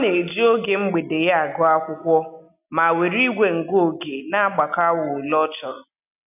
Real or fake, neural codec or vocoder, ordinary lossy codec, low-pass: real; none; none; 3.6 kHz